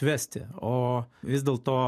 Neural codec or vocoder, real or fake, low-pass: none; real; 14.4 kHz